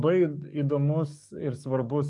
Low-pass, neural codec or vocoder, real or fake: 10.8 kHz; codec, 44.1 kHz, 7.8 kbps, DAC; fake